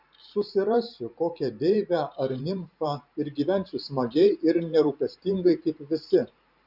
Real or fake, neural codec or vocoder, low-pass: fake; vocoder, 44.1 kHz, 128 mel bands every 512 samples, BigVGAN v2; 5.4 kHz